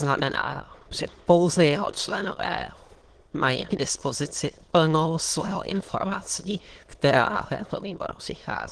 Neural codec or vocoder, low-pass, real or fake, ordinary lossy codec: autoencoder, 22.05 kHz, a latent of 192 numbers a frame, VITS, trained on many speakers; 9.9 kHz; fake; Opus, 16 kbps